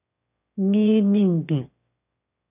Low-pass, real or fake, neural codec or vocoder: 3.6 kHz; fake; autoencoder, 22.05 kHz, a latent of 192 numbers a frame, VITS, trained on one speaker